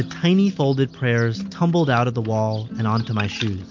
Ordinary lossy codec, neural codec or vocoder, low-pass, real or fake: MP3, 48 kbps; none; 7.2 kHz; real